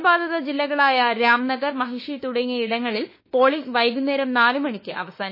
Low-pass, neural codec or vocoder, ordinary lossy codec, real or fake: 5.4 kHz; autoencoder, 48 kHz, 32 numbers a frame, DAC-VAE, trained on Japanese speech; MP3, 24 kbps; fake